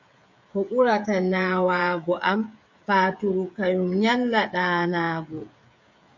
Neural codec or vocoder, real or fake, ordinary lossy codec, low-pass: codec, 16 kHz, 16 kbps, FreqCodec, smaller model; fake; MP3, 48 kbps; 7.2 kHz